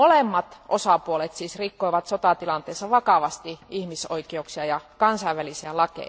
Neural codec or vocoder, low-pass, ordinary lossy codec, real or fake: none; none; none; real